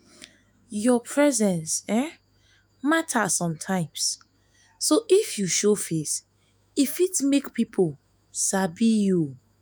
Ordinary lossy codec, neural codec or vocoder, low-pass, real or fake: none; autoencoder, 48 kHz, 128 numbers a frame, DAC-VAE, trained on Japanese speech; none; fake